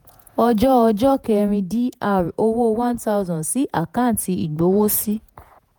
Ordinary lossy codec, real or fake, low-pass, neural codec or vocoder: none; fake; none; vocoder, 48 kHz, 128 mel bands, Vocos